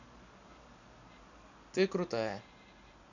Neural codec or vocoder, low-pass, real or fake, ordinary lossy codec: none; 7.2 kHz; real; none